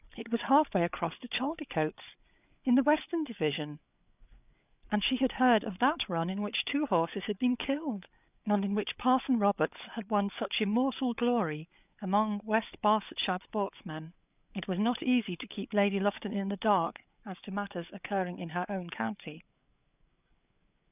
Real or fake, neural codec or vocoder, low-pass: fake; codec, 16 kHz, 8 kbps, FreqCodec, larger model; 3.6 kHz